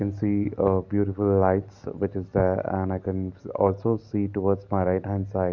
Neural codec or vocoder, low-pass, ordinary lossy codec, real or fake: none; 7.2 kHz; none; real